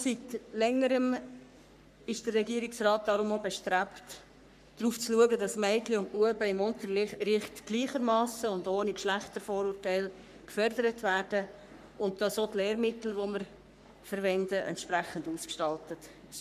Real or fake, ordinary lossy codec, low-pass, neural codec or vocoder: fake; none; 14.4 kHz; codec, 44.1 kHz, 3.4 kbps, Pupu-Codec